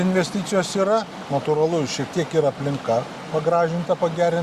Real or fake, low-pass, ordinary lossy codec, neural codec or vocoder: real; 14.4 kHz; Opus, 64 kbps; none